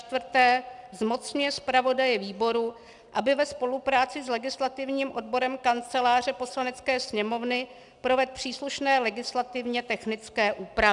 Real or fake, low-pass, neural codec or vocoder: real; 10.8 kHz; none